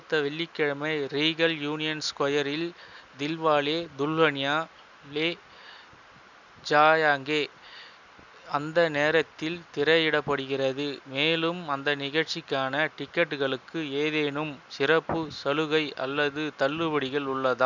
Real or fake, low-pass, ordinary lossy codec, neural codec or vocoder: real; 7.2 kHz; none; none